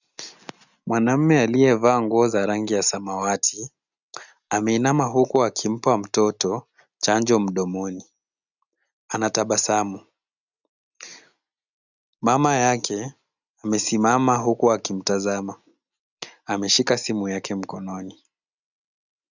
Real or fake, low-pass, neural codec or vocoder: real; 7.2 kHz; none